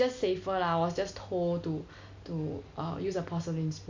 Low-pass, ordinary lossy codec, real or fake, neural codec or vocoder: 7.2 kHz; MP3, 64 kbps; real; none